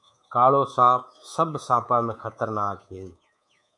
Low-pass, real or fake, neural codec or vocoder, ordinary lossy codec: 10.8 kHz; fake; codec, 24 kHz, 3.1 kbps, DualCodec; MP3, 96 kbps